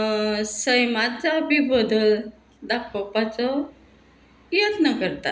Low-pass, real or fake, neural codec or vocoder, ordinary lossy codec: none; real; none; none